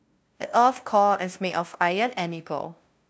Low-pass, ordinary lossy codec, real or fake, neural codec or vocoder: none; none; fake; codec, 16 kHz, 0.5 kbps, FunCodec, trained on LibriTTS, 25 frames a second